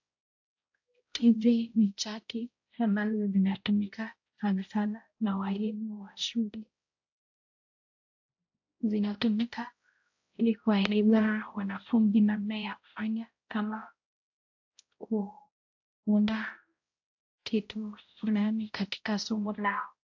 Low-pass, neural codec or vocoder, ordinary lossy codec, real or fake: 7.2 kHz; codec, 16 kHz, 0.5 kbps, X-Codec, HuBERT features, trained on balanced general audio; AAC, 48 kbps; fake